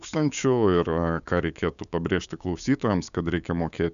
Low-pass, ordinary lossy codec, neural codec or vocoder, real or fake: 7.2 kHz; MP3, 96 kbps; codec, 16 kHz, 6 kbps, DAC; fake